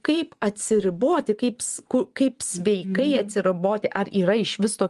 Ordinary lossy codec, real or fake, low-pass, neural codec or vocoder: Opus, 32 kbps; fake; 10.8 kHz; vocoder, 24 kHz, 100 mel bands, Vocos